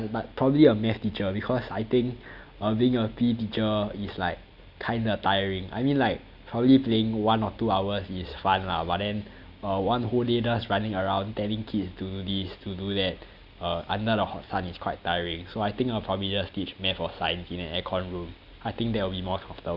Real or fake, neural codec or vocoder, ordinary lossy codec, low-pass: real; none; none; 5.4 kHz